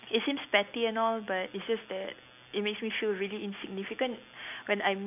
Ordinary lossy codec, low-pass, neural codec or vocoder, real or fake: none; 3.6 kHz; none; real